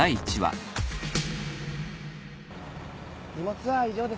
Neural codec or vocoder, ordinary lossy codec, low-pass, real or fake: none; none; none; real